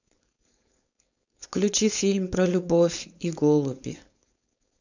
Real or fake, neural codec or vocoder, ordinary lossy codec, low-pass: fake; codec, 16 kHz, 4.8 kbps, FACodec; none; 7.2 kHz